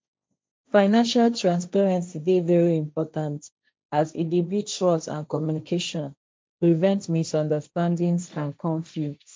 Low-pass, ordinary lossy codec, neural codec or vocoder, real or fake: none; none; codec, 16 kHz, 1.1 kbps, Voila-Tokenizer; fake